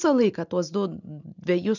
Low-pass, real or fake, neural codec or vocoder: 7.2 kHz; real; none